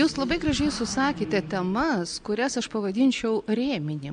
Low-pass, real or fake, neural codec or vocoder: 9.9 kHz; real; none